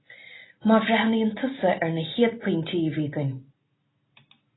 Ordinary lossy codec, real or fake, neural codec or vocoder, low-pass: AAC, 16 kbps; real; none; 7.2 kHz